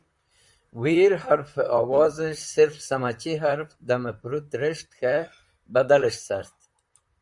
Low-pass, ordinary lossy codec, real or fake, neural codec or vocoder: 10.8 kHz; Opus, 64 kbps; fake; vocoder, 44.1 kHz, 128 mel bands, Pupu-Vocoder